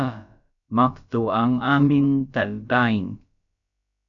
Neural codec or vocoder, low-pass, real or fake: codec, 16 kHz, about 1 kbps, DyCAST, with the encoder's durations; 7.2 kHz; fake